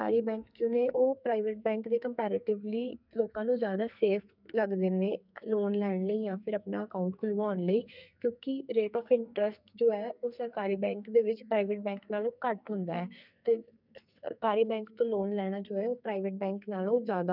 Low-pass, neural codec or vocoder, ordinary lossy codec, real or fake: 5.4 kHz; codec, 44.1 kHz, 2.6 kbps, SNAC; none; fake